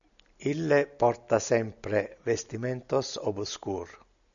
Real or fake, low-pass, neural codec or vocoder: real; 7.2 kHz; none